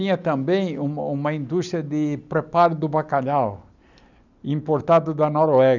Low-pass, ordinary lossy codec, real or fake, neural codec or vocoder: 7.2 kHz; none; real; none